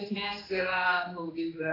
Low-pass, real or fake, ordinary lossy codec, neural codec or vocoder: 5.4 kHz; fake; AAC, 24 kbps; codec, 16 kHz, 2 kbps, X-Codec, HuBERT features, trained on general audio